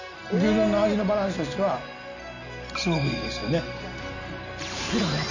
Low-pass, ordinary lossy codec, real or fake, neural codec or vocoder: 7.2 kHz; none; real; none